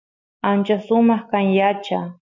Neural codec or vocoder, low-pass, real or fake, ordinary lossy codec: none; 7.2 kHz; real; MP3, 64 kbps